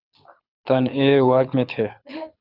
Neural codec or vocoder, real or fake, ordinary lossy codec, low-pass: codec, 24 kHz, 6 kbps, HILCodec; fake; Opus, 64 kbps; 5.4 kHz